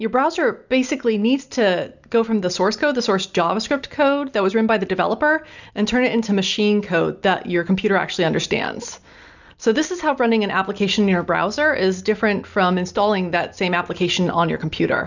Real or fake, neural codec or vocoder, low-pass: real; none; 7.2 kHz